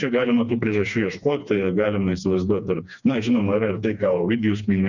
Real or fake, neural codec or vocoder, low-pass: fake; codec, 16 kHz, 2 kbps, FreqCodec, smaller model; 7.2 kHz